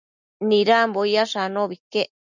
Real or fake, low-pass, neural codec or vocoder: real; 7.2 kHz; none